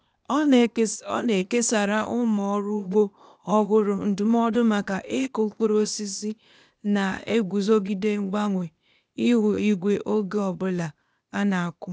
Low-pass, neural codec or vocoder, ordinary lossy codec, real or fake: none; codec, 16 kHz, 0.8 kbps, ZipCodec; none; fake